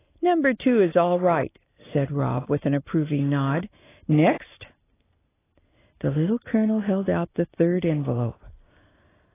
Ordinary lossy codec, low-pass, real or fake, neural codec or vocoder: AAC, 16 kbps; 3.6 kHz; real; none